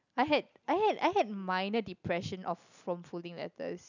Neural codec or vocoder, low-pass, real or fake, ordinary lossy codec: none; 7.2 kHz; real; none